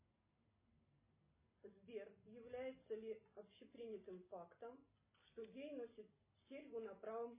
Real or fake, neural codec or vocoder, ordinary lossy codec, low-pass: real; none; MP3, 16 kbps; 3.6 kHz